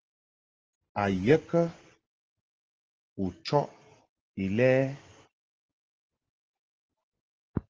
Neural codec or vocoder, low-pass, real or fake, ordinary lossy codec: none; 7.2 kHz; real; Opus, 16 kbps